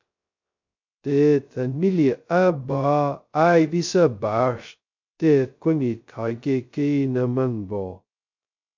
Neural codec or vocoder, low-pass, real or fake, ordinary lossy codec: codec, 16 kHz, 0.2 kbps, FocalCodec; 7.2 kHz; fake; MP3, 64 kbps